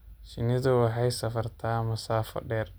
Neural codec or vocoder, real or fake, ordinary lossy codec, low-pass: none; real; none; none